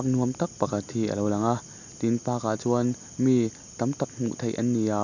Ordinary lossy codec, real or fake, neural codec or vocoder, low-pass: none; real; none; 7.2 kHz